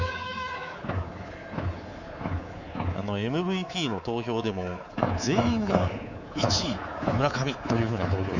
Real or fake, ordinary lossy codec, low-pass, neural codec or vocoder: fake; none; 7.2 kHz; codec, 24 kHz, 3.1 kbps, DualCodec